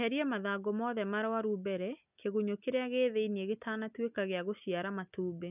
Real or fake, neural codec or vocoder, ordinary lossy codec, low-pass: real; none; none; 3.6 kHz